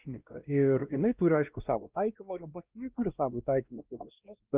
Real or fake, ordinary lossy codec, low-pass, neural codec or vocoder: fake; Opus, 24 kbps; 3.6 kHz; codec, 16 kHz, 1 kbps, X-Codec, WavLM features, trained on Multilingual LibriSpeech